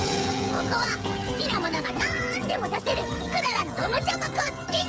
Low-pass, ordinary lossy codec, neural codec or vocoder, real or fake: none; none; codec, 16 kHz, 16 kbps, FreqCodec, smaller model; fake